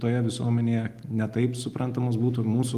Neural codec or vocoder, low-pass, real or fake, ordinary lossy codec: none; 14.4 kHz; real; Opus, 24 kbps